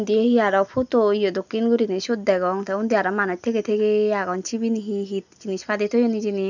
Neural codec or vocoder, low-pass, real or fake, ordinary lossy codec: none; 7.2 kHz; real; none